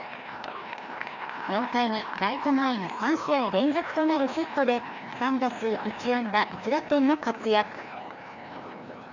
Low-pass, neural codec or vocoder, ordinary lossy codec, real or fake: 7.2 kHz; codec, 16 kHz, 1 kbps, FreqCodec, larger model; none; fake